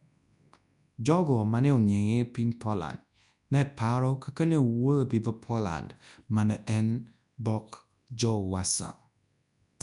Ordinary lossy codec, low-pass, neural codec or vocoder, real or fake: none; 10.8 kHz; codec, 24 kHz, 0.9 kbps, WavTokenizer, large speech release; fake